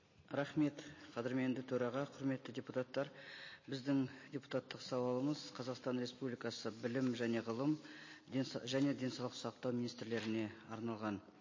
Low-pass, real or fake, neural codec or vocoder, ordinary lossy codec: 7.2 kHz; real; none; MP3, 32 kbps